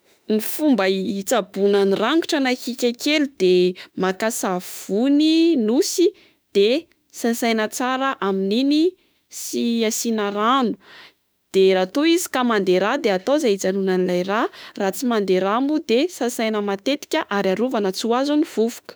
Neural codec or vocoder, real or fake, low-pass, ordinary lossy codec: autoencoder, 48 kHz, 32 numbers a frame, DAC-VAE, trained on Japanese speech; fake; none; none